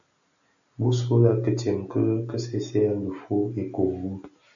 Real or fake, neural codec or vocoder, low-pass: real; none; 7.2 kHz